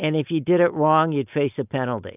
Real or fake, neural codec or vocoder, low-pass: real; none; 3.6 kHz